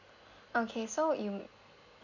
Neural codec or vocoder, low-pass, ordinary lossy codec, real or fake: none; 7.2 kHz; none; real